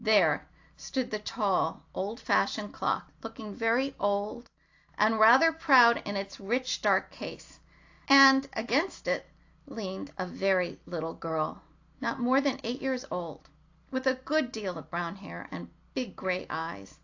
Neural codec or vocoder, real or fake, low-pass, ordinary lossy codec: none; real; 7.2 kHz; AAC, 48 kbps